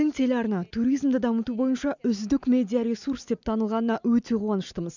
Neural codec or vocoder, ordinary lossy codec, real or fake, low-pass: none; none; real; 7.2 kHz